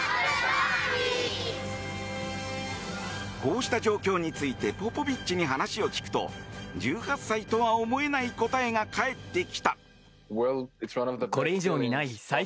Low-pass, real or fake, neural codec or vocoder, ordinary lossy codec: none; real; none; none